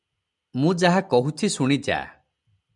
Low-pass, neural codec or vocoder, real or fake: 10.8 kHz; none; real